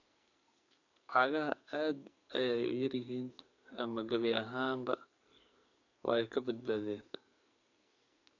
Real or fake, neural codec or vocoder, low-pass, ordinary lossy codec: fake; codec, 44.1 kHz, 2.6 kbps, SNAC; 7.2 kHz; none